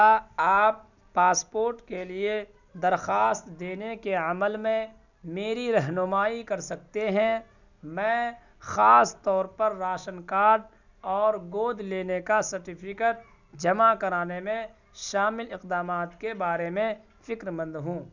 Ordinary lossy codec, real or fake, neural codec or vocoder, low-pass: none; real; none; 7.2 kHz